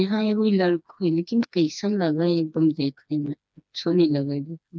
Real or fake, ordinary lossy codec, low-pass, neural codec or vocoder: fake; none; none; codec, 16 kHz, 2 kbps, FreqCodec, smaller model